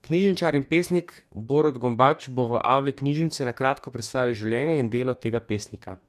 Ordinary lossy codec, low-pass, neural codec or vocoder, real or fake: none; 14.4 kHz; codec, 44.1 kHz, 2.6 kbps, DAC; fake